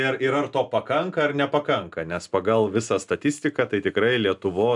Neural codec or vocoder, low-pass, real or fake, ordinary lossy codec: none; 10.8 kHz; real; MP3, 96 kbps